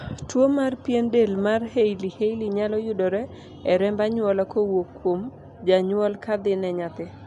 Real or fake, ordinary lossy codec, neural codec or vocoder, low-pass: real; MP3, 96 kbps; none; 10.8 kHz